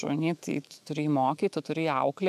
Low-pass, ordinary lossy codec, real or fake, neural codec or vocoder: 14.4 kHz; AAC, 96 kbps; fake; autoencoder, 48 kHz, 128 numbers a frame, DAC-VAE, trained on Japanese speech